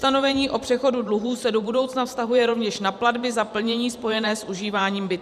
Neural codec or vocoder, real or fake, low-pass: vocoder, 44.1 kHz, 128 mel bands every 512 samples, BigVGAN v2; fake; 14.4 kHz